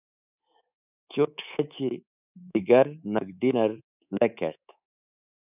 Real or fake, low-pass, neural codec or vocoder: fake; 3.6 kHz; codec, 24 kHz, 3.1 kbps, DualCodec